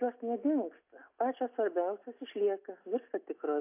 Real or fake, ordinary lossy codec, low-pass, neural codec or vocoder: real; AAC, 24 kbps; 3.6 kHz; none